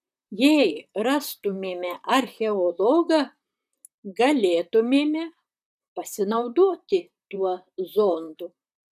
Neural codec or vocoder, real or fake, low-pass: vocoder, 44.1 kHz, 128 mel bands, Pupu-Vocoder; fake; 14.4 kHz